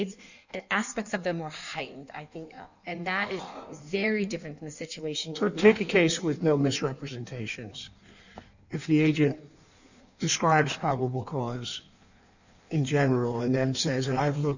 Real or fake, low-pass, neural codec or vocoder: fake; 7.2 kHz; codec, 16 kHz in and 24 kHz out, 1.1 kbps, FireRedTTS-2 codec